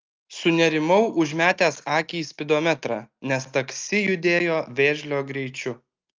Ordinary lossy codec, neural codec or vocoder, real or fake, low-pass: Opus, 24 kbps; none; real; 7.2 kHz